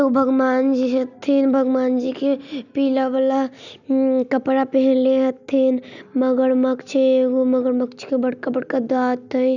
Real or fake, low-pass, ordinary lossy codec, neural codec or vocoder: real; 7.2 kHz; none; none